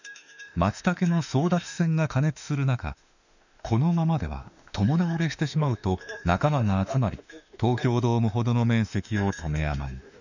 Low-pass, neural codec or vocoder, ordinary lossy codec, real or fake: 7.2 kHz; autoencoder, 48 kHz, 32 numbers a frame, DAC-VAE, trained on Japanese speech; none; fake